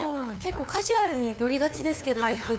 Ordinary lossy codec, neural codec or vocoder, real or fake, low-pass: none; codec, 16 kHz, 2 kbps, FunCodec, trained on LibriTTS, 25 frames a second; fake; none